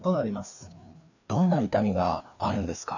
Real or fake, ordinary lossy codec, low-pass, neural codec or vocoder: fake; none; 7.2 kHz; codec, 16 kHz, 2 kbps, FreqCodec, larger model